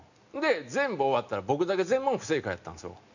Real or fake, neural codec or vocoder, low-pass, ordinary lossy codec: real; none; 7.2 kHz; none